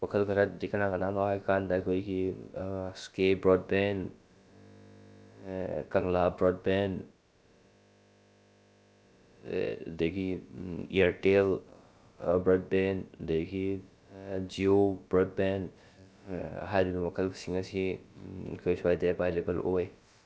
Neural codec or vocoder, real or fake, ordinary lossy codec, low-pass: codec, 16 kHz, about 1 kbps, DyCAST, with the encoder's durations; fake; none; none